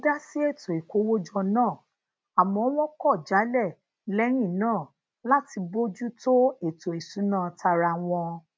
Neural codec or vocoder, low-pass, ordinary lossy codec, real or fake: none; none; none; real